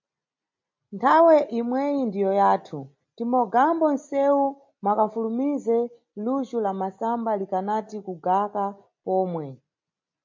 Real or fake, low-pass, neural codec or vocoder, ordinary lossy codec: real; 7.2 kHz; none; MP3, 48 kbps